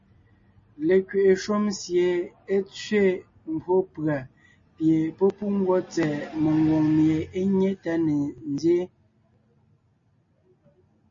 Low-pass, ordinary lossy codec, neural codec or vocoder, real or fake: 7.2 kHz; MP3, 32 kbps; none; real